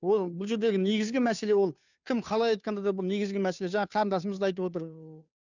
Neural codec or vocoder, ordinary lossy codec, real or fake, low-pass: codec, 16 kHz, 2 kbps, FunCodec, trained on Chinese and English, 25 frames a second; none; fake; 7.2 kHz